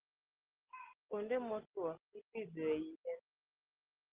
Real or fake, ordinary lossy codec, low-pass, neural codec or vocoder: real; Opus, 16 kbps; 3.6 kHz; none